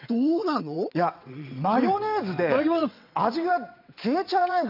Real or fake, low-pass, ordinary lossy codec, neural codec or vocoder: fake; 5.4 kHz; none; vocoder, 22.05 kHz, 80 mel bands, WaveNeXt